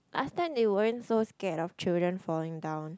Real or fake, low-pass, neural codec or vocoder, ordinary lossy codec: real; none; none; none